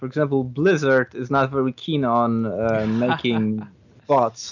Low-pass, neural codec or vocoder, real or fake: 7.2 kHz; none; real